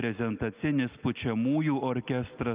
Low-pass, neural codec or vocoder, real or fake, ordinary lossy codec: 3.6 kHz; none; real; Opus, 24 kbps